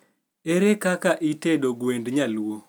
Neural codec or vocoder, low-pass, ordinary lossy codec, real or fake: none; none; none; real